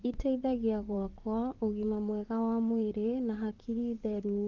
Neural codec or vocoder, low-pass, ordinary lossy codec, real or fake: vocoder, 44.1 kHz, 128 mel bands, Pupu-Vocoder; 7.2 kHz; Opus, 16 kbps; fake